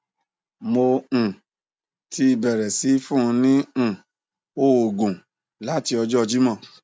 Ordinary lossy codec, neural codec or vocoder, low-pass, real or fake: none; none; none; real